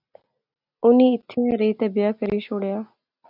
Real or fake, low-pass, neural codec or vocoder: real; 5.4 kHz; none